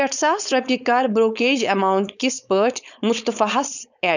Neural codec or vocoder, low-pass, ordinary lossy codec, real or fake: codec, 16 kHz, 4.8 kbps, FACodec; 7.2 kHz; none; fake